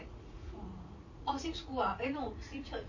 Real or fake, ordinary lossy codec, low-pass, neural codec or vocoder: fake; MP3, 32 kbps; 7.2 kHz; vocoder, 44.1 kHz, 80 mel bands, Vocos